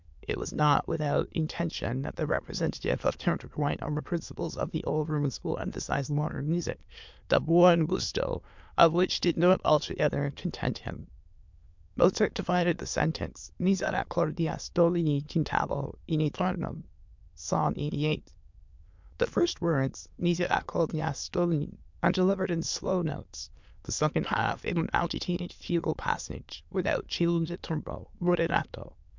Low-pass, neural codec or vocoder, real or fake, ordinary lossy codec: 7.2 kHz; autoencoder, 22.05 kHz, a latent of 192 numbers a frame, VITS, trained on many speakers; fake; MP3, 64 kbps